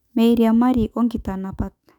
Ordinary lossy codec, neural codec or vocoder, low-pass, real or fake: none; autoencoder, 48 kHz, 128 numbers a frame, DAC-VAE, trained on Japanese speech; 19.8 kHz; fake